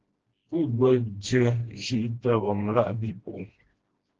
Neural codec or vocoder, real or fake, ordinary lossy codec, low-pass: codec, 16 kHz, 1 kbps, FreqCodec, smaller model; fake; Opus, 16 kbps; 7.2 kHz